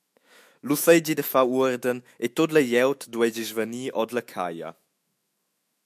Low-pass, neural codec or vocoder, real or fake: 14.4 kHz; autoencoder, 48 kHz, 128 numbers a frame, DAC-VAE, trained on Japanese speech; fake